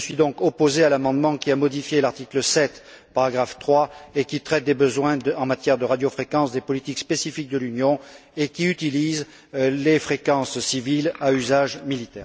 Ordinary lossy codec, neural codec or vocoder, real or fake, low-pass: none; none; real; none